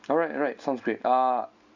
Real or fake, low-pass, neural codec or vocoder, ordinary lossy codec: real; 7.2 kHz; none; MP3, 64 kbps